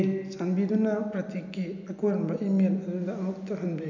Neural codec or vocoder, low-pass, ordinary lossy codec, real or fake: none; 7.2 kHz; none; real